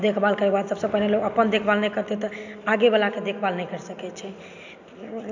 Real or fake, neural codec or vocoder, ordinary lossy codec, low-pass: real; none; AAC, 48 kbps; 7.2 kHz